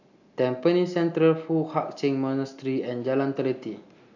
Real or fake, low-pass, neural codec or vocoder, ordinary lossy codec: real; 7.2 kHz; none; none